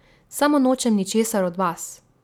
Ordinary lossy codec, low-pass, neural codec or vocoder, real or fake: none; 19.8 kHz; none; real